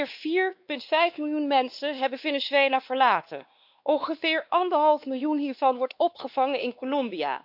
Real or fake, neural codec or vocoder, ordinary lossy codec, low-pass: fake; codec, 16 kHz, 2 kbps, X-Codec, WavLM features, trained on Multilingual LibriSpeech; AAC, 48 kbps; 5.4 kHz